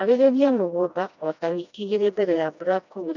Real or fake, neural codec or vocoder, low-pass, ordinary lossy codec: fake; codec, 16 kHz, 1 kbps, FreqCodec, smaller model; 7.2 kHz; none